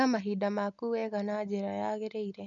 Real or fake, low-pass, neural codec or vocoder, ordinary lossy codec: real; 7.2 kHz; none; none